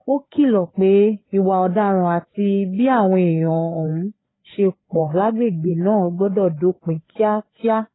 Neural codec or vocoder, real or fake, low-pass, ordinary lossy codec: codec, 16 kHz, 4 kbps, FreqCodec, larger model; fake; 7.2 kHz; AAC, 16 kbps